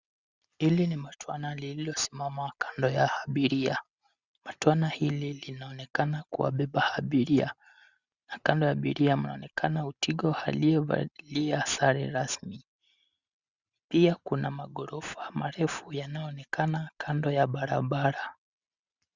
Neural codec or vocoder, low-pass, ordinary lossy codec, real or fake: none; 7.2 kHz; Opus, 64 kbps; real